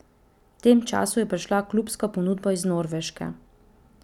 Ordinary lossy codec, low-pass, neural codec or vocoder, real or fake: none; 19.8 kHz; none; real